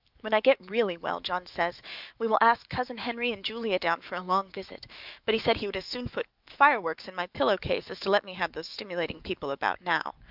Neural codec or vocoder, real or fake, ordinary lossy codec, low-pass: autoencoder, 48 kHz, 128 numbers a frame, DAC-VAE, trained on Japanese speech; fake; Opus, 24 kbps; 5.4 kHz